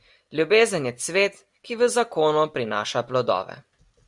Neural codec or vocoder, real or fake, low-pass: none; real; 10.8 kHz